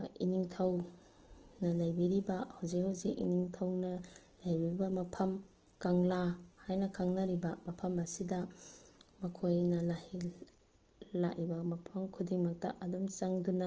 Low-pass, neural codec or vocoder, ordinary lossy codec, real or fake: 7.2 kHz; none; Opus, 16 kbps; real